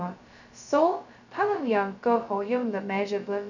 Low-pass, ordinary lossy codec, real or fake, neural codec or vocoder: 7.2 kHz; none; fake; codec, 16 kHz, 0.2 kbps, FocalCodec